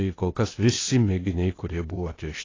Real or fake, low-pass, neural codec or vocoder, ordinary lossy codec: fake; 7.2 kHz; codec, 16 kHz, 0.8 kbps, ZipCodec; AAC, 32 kbps